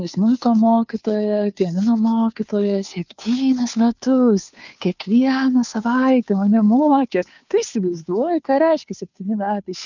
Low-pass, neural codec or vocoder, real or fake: 7.2 kHz; codec, 16 kHz, 2 kbps, FunCodec, trained on Chinese and English, 25 frames a second; fake